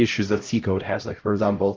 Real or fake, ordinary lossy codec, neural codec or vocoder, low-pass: fake; Opus, 32 kbps; codec, 16 kHz, 0.5 kbps, X-Codec, HuBERT features, trained on LibriSpeech; 7.2 kHz